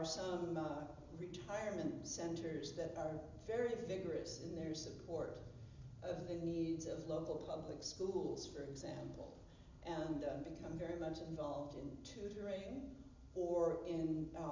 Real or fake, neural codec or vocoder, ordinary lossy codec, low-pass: real; none; MP3, 64 kbps; 7.2 kHz